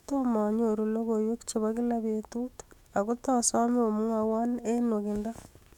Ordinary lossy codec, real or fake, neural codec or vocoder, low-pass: none; fake; autoencoder, 48 kHz, 128 numbers a frame, DAC-VAE, trained on Japanese speech; 19.8 kHz